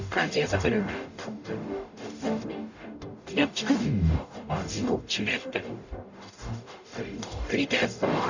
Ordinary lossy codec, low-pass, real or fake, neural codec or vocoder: none; 7.2 kHz; fake; codec, 44.1 kHz, 0.9 kbps, DAC